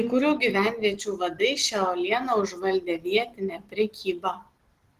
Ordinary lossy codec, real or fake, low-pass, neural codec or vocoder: Opus, 16 kbps; real; 14.4 kHz; none